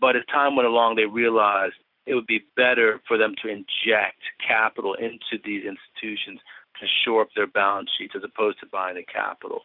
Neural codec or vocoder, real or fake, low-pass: none; real; 5.4 kHz